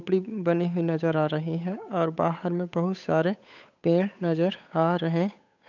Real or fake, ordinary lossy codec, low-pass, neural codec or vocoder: fake; none; 7.2 kHz; codec, 16 kHz, 8 kbps, FunCodec, trained on Chinese and English, 25 frames a second